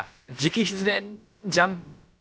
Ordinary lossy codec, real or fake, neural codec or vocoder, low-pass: none; fake; codec, 16 kHz, about 1 kbps, DyCAST, with the encoder's durations; none